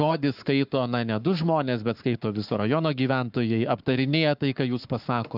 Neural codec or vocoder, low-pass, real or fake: codec, 44.1 kHz, 7.8 kbps, Pupu-Codec; 5.4 kHz; fake